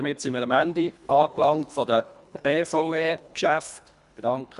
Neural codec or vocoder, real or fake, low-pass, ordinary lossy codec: codec, 24 kHz, 1.5 kbps, HILCodec; fake; 10.8 kHz; none